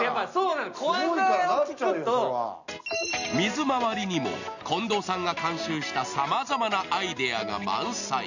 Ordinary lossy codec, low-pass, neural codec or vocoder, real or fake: none; 7.2 kHz; none; real